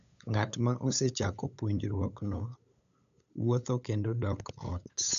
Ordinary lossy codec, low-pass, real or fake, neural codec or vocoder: none; 7.2 kHz; fake; codec, 16 kHz, 8 kbps, FunCodec, trained on LibriTTS, 25 frames a second